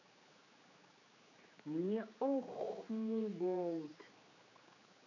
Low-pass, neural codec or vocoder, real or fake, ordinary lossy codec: 7.2 kHz; codec, 16 kHz, 4 kbps, X-Codec, HuBERT features, trained on general audio; fake; none